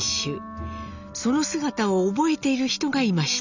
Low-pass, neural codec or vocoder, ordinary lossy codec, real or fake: 7.2 kHz; none; none; real